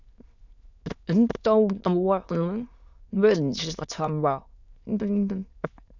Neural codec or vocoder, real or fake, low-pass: autoencoder, 22.05 kHz, a latent of 192 numbers a frame, VITS, trained on many speakers; fake; 7.2 kHz